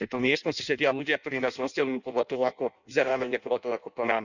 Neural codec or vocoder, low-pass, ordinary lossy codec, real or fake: codec, 16 kHz in and 24 kHz out, 0.6 kbps, FireRedTTS-2 codec; 7.2 kHz; none; fake